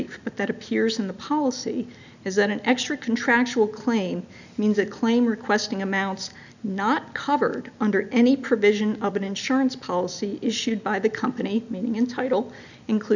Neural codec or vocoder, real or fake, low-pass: none; real; 7.2 kHz